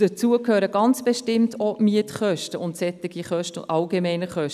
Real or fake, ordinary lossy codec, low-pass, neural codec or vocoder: real; none; 14.4 kHz; none